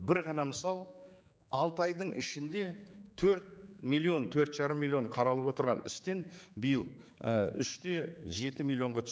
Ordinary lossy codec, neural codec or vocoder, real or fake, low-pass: none; codec, 16 kHz, 4 kbps, X-Codec, HuBERT features, trained on general audio; fake; none